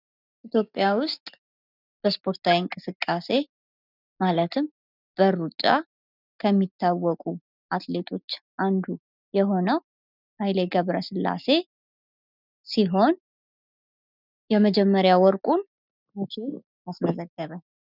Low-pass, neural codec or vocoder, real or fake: 5.4 kHz; none; real